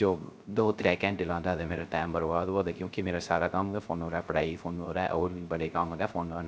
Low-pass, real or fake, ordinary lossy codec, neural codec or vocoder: none; fake; none; codec, 16 kHz, 0.3 kbps, FocalCodec